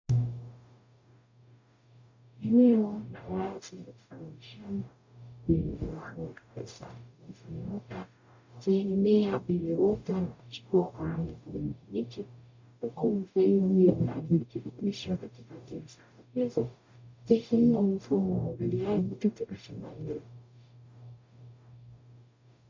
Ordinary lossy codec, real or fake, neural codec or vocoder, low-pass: MP3, 64 kbps; fake; codec, 44.1 kHz, 0.9 kbps, DAC; 7.2 kHz